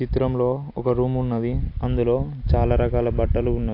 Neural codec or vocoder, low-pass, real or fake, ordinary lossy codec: none; 5.4 kHz; real; AAC, 32 kbps